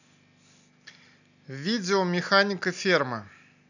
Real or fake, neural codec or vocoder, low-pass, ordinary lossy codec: real; none; 7.2 kHz; none